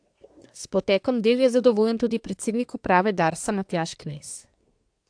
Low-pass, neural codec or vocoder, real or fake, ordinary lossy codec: 9.9 kHz; codec, 24 kHz, 1 kbps, SNAC; fake; AAC, 64 kbps